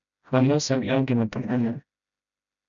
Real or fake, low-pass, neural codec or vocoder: fake; 7.2 kHz; codec, 16 kHz, 0.5 kbps, FreqCodec, smaller model